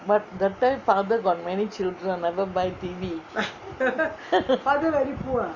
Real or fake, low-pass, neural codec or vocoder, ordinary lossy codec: real; 7.2 kHz; none; none